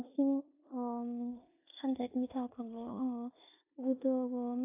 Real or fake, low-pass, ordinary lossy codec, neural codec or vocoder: fake; 3.6 kHz; AAC, 24 kbps; codec, 16 kHz in and 24 kHz out, 0.9 kbps, LongCat-Audio-Codec, four codebook decoder